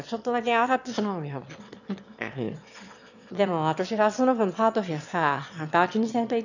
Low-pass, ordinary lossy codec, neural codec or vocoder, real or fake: 7.2 kHz; none; autoencoder, 22.05 kHz, a latent of 192 numbers a frame, VITS, trained on one speaker; fake